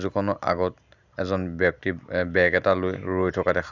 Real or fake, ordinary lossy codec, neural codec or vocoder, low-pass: real; none; none; 7.2 kHz